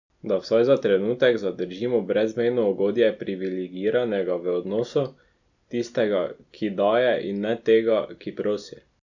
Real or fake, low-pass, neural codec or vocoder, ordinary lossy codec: real; 7.2 kHz; none; none